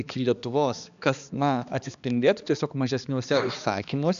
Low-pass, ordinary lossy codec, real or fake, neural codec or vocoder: 7.2 kHz; AAC, 96 kbps; fake; codec, 16 kHz, 2 kbps, X-Codec, HuBERT features, trained on balanced general audio